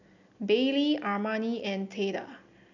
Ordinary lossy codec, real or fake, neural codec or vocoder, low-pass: none; real; none; 7.2 kHz